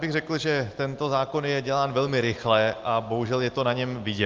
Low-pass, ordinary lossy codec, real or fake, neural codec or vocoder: 7.2 kHz; Opus, 32 kbps; real; none